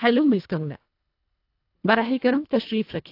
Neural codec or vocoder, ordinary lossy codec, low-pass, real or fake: codec, 24 kHz, 1.5 kbps, HILCodec; AAC, 32 kbps; 5.4 kHz; fake